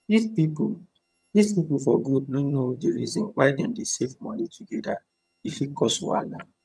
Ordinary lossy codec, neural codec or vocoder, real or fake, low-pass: none; vocoder, 22.05 kHz, 80 mel bands, HiFi-GAN; fake; none